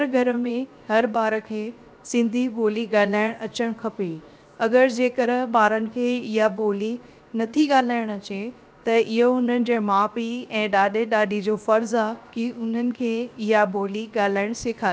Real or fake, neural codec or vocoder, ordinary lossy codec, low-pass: fake; codec, 16 kHz, 0.7 kbps, FocalCodec; none; none